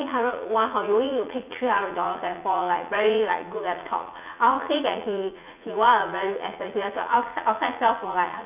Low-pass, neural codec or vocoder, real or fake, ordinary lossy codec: 3.6 kHz; vocoder, 44.1 kHz, 80 mel bands, Vocos; fake; none